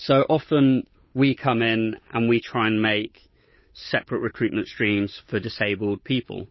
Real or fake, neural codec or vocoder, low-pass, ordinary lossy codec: fake; codec, 16 kHz, 8 kbps, FunCodec, trained on Chinese and English, 25 frames a second; 7.2 kHz; MP3, 24 kbps